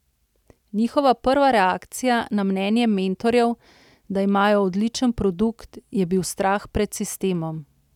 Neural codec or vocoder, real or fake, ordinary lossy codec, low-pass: none; real; none; 19.8 kHz